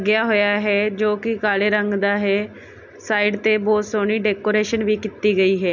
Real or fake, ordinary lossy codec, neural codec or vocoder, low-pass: real; none; none; 7.2 kHz